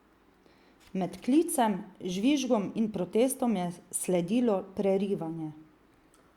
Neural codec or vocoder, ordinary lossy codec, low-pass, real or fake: none; Opus, 64 kbps; 19.8 kHz; real